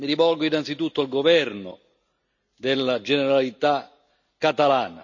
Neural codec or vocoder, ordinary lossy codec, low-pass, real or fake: none; none; 7.2 kHz; real